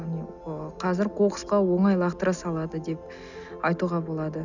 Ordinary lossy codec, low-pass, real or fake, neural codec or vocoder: none; 7.2 kHz; real; none